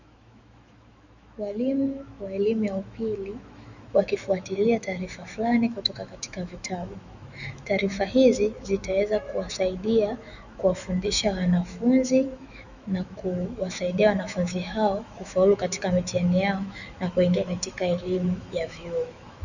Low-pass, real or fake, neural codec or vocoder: 7.2 kHz; fake; autoencoder, 48 kHz, 128 numbers a frame, DAC-VAE, trained on Japanese speech